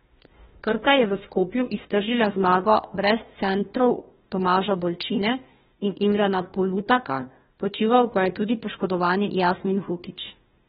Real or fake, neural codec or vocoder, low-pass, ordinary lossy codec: fake; codec, 16 kHz, 1 kbps, FunCodec, trained on Chinese and English, 50 frames a second; 7.2 kHz; AAC, 16 kbps